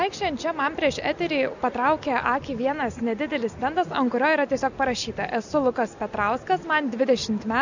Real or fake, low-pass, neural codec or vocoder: real; 7.2 kHz; none